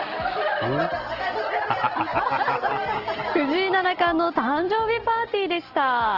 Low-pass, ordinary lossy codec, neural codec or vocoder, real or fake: 5.4 kHz; Opus, 16 kbps; none; real